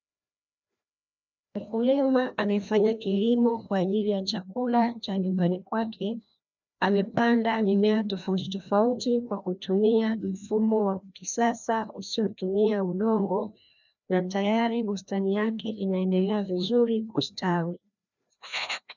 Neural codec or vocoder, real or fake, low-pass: codec, 16 kHz, 1 kbps, FreqCodec, larger model; fake; 7.2 kHz